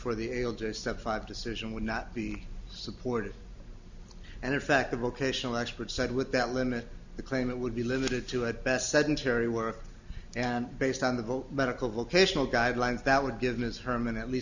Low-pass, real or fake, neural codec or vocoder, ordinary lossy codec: 7.2 kHz; real; none; Opus, 64 kbps